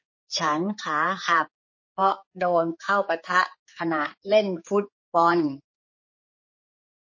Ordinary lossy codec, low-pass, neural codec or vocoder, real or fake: MP3, 32 kbps; 7.2 kHz; codec, 16 kHz, 4 kbps, X-Codec, HuBERT features, trained on general audio; fake